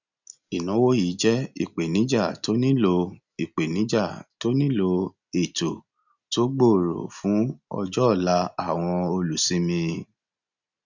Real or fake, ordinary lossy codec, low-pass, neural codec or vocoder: real; none; 7.2 kHz; none